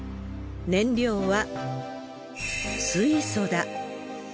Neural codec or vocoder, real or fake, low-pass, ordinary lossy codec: none; real; none; none